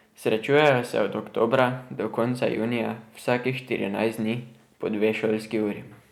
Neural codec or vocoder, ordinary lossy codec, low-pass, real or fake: none; none; 19.8 kHz; real